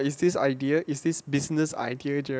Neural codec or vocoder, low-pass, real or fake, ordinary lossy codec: none; none; real; none